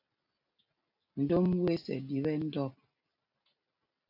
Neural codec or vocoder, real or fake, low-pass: none; real; 5.4 kHz